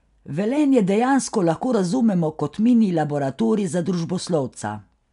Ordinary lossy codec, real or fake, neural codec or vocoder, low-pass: none; real; none; 10.8 kHz